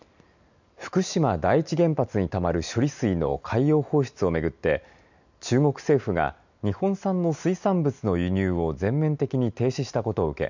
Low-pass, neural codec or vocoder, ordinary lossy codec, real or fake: 7.2 kHz; none; none; real